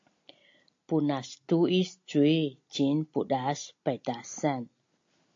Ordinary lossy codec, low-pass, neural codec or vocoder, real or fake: AAC, 48 kbps; 7.2 kHz; none; real